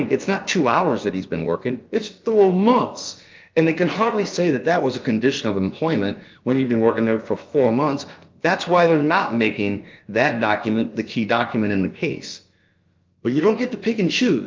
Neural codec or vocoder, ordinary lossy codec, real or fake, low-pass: codec, 16 kHz, about 1 kbps, DyCAST, with the encoder's durations; Opus, 32 kbps; fake; 7.2 kHz